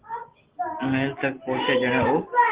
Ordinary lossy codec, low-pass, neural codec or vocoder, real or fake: Opus, 16 kbps; 3.6 kHz; none; real